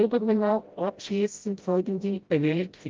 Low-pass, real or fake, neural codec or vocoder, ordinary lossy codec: 7.2 kHz; fake; codec, 16 kHz, 0.5 kbps, FreqCodec, smaller model; Opus, 32 kbps